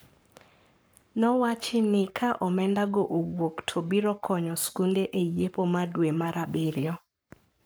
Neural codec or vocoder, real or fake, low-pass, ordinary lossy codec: codec, 44.1 kHz, 7.8 kbps, Pupu-Codec; fake; none; none